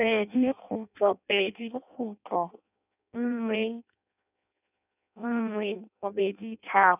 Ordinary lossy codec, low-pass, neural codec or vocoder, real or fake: none; 3.6 kHz; codec, 16 kHz in and 24 kHz out, 0.6 kbps, FireRedTTS-2 codec; fake